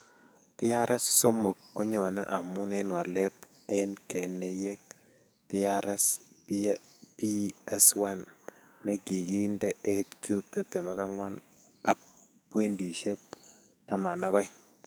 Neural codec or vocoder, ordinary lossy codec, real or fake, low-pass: codec, 44.1 kHz, 2.6 kbps, SNAC; none; fake; none